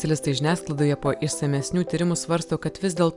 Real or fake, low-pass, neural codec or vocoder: real; 10.8 kHz; none